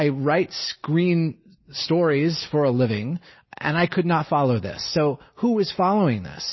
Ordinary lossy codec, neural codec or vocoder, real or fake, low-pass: MP3, 24 kbps; none; real; 7.2 kHz